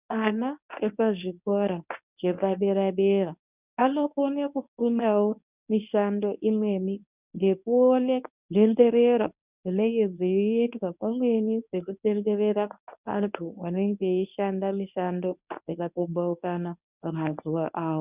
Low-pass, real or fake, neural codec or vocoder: 3.6 kHz; fake; codec, 24 kHz, 0.9 kbps, WavTokenizer, medium speech release version 1